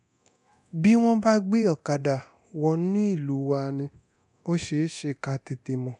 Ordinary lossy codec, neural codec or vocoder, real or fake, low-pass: none; codec, 24 kHz, 0.9 kbps, DualCodec; fake; 10.8 kHz